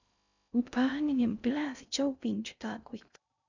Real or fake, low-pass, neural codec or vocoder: fake; 7.2 kHz; codec, 16 kHz in and 24 kHz out, 0.6 kbps, FocalCodec, streaming, 2048 codes